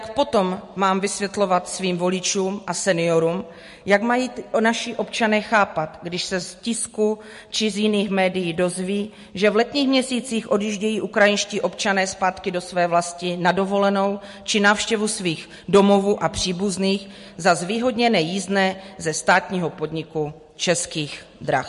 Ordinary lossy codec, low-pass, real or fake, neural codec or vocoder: MP3, 48 kbps; 14.4 kHz; real; none